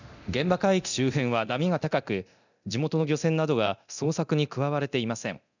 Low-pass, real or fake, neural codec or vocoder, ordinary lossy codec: 7.2 kHz; fake; codec, 24 kHz, 0.9 kbps, DualCodec; none